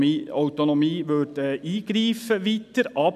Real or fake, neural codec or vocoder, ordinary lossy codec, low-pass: real; none; none; 14.4 kHz